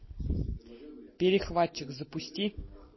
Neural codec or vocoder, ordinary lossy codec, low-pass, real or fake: none; MP3, 24 kbps; 7.2 kHz; real